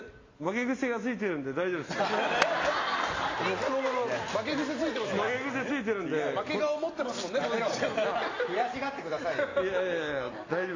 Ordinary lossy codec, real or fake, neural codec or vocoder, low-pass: AAC, 32 kbps; real; none; 7.2 kHz